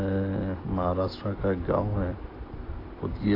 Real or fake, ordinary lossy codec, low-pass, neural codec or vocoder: real; AAC, 24 kbps; 5.4 kHz; none